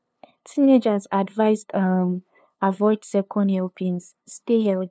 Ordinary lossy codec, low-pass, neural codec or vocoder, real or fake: none; none; codec, 16 kHz, 2 kbps, FunCodec, trained on LibriTTS, 25 frames a second; fake